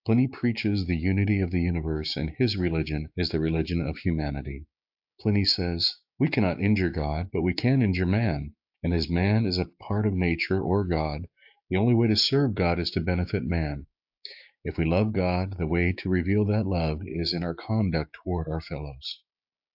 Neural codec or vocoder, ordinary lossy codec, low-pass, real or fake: codec, 16 kHz, 6 kbps, DAC; AAC, 48 kbps; 5.4 kHz; fake